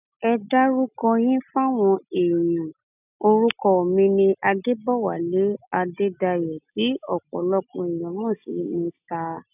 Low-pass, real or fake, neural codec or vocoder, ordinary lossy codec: 3.6 kHz; real; none; none